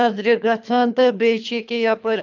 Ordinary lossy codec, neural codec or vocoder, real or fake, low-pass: none; codec, 24 kHz, 3 kbps, HILCodec; fake; 7.2 kHz